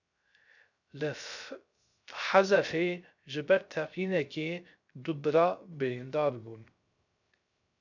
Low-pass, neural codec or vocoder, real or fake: 7.2 kHz; codec, 16 kHz, 0.3 kbps, FocalCodec; fake